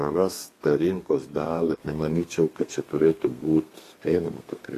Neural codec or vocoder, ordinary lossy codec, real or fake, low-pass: codec, 32 kHz, 1.9 kbps, SNAC; AAC, 48 kbps; fake; 14.4 kHz